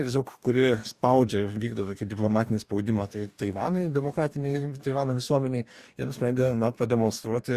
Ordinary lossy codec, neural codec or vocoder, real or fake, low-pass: Opus, 64 kbps; codec, 44.1 kHz, 2.6 kbps, DAC; fake; 14.4 kHz